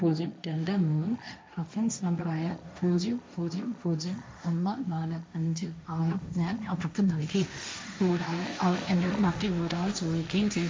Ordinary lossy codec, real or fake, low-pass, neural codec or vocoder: none; fake; none; codec, 16 kHz, 1.1 kbps, Voila-Tokenizer